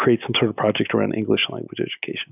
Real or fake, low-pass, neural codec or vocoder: real; 3.6 kHz; none